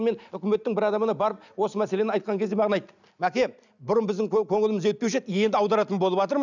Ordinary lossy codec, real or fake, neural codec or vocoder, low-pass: none; real; none; 7.2 kHz